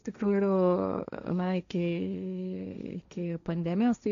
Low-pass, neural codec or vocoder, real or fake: 7.2 kHz; codec, 16 kHz, 1.1 kbps, Voila-Tokenizer; fake